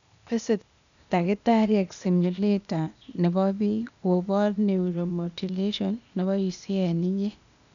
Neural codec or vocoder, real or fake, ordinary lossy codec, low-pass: codec, 16 kHz, 0.8 kbps, ZipCodec; fake; none; 7.2 kHz